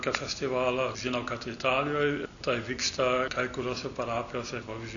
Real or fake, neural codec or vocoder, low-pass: real; none; 7.2 kHz